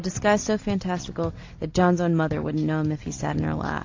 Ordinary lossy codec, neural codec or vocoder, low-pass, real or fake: AAC, 48 kbps; none; 7.2 kHz; real